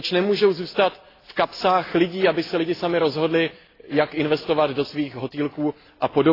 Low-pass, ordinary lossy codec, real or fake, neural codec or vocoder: 5.4 kHz; AAC, 24 kbps; real; none